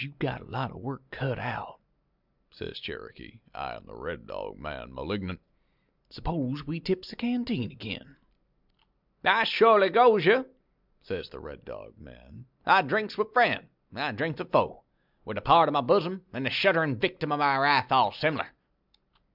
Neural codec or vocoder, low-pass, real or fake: none; 5.4 kHz; real